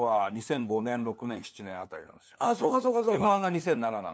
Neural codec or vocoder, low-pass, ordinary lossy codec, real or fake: codec, 16 kHz, 2 kbps, FunCodec, trained on LibriTTS, 25 frames a second; none; none; fake